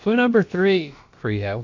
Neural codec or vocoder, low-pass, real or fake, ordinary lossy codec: codec, 16 kHz, about 1 kbps, DyCAST, with the encoder's durations; 7.2 kHz; fake; MP3, 48 kbps